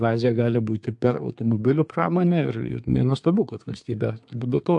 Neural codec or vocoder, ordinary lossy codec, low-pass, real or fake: codec, 24 kHz, 1 kbps, SNAC; AAC, 64 kbps; 10.8 kHz; fake